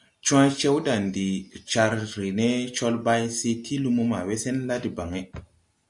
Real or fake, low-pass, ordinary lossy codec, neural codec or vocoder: real; 10.8 kHz; MP3, 96 kbps; none